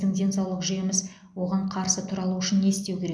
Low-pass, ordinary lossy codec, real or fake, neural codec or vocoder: none; none; real; none